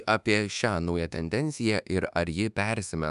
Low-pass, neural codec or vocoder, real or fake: 10.8 kHz; codec, 24 kHz, 1.2 kbps, DualCodec; fake